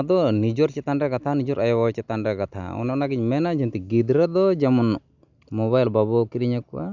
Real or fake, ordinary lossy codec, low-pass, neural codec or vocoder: real; none; 7.2 kHz; none